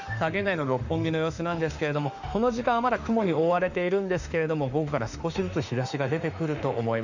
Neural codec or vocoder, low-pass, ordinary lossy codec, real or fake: autoencoder, 48 kHz, 32 numbers a frame, DAC-VAE, trained on Japanese speech; 7.2 kHz; none; fake